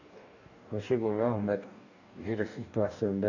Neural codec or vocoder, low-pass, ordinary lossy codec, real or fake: codec, 44.1 kHz, 2.6 kbps, DAC; 7.2 kHz; none; fake